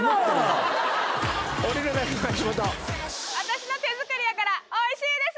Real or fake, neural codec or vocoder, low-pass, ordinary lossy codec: real; none; none; none